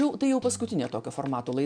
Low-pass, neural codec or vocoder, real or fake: 9.9 kHz; none; real